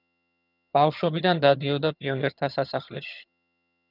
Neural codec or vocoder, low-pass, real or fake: vocoder, 22.05 kHz, 80 mel bands, HiFi-GAN; 5.4 kHz; fake